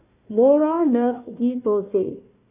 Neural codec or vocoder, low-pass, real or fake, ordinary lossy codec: codec, 16 kHz, 1 kbps, FunCodec, trained on Chinese and English, 50 frames a second; 3.6 kHz; fake; none